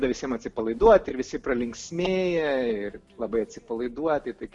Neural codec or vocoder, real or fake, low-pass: none; real; 10.8 kHz